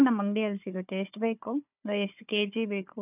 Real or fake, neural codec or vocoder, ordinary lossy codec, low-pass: fake; codec, 16 kHz, 4 kbps, FunCodec, trained on Chinese and English, 50 frames a second; none; 3.6 kHz